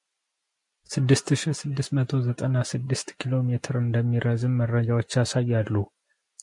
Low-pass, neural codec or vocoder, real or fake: 10.8 kHz; none; real